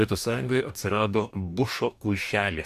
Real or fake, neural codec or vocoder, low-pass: fake; codec, 44.1 kHz, 2.6 kbps, DAC; 14.4 kHz